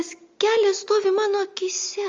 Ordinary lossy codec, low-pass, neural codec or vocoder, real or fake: Opus, 32 kbps; 7.2 kHz; none; real